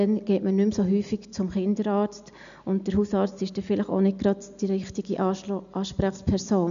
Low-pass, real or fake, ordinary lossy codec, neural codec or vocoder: 7.2 kHz; real; none; none